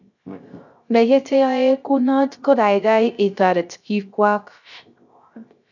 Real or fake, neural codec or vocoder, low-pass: fake; codec, 16 kHz, 0.3 kbps, FocalCodec; 7.2 kHz